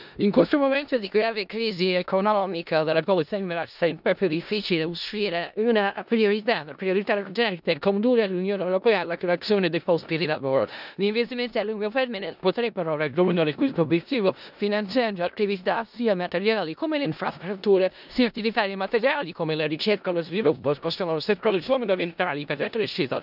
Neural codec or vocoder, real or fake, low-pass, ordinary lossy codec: codec, 16 kHz in and 24 kHz out, 0.4 kbps, LongCat-Audio-Codec, four codebook decoder; fake; 5.4 kHz; none